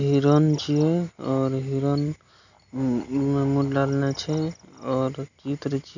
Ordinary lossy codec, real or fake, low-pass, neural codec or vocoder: none; real; 7.2 kHz; none